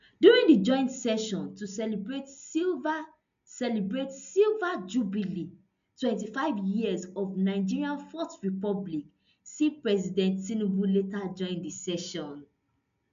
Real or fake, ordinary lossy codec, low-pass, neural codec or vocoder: real; none; 7.2 kHz; none